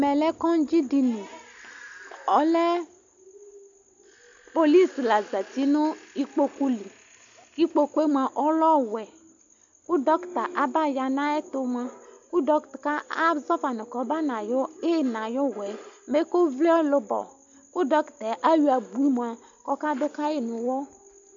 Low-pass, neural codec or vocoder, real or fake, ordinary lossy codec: 7.2 kHz; none; real; AAC, 64 kbps